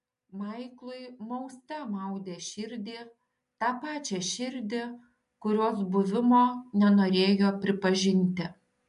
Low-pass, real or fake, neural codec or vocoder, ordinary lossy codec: 10.8 kHz; real; none; MP3, 64 kbps